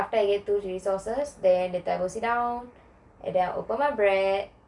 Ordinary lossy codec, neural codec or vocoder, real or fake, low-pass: none; none; real; 10.8 kHz